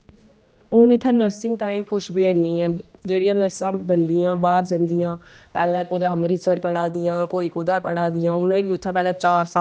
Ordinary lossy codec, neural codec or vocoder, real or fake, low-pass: none; codec, 16 kHz, 1 kbps, X-Codec, HuBERT features, trained on general audio; fake; none